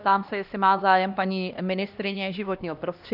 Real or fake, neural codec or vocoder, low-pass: fake; codec, 16 kHz, 1 kbps, X-Codec, HuBERT features, trained on LibriSpeech; 5.4 kHz